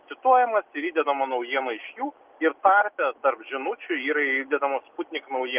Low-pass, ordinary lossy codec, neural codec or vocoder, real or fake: 3.6 kHz; Opus, 24 kbps; none; real